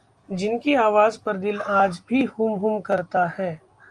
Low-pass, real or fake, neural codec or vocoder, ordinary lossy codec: 10.8 kHz; real; none; Opus, 32 kbps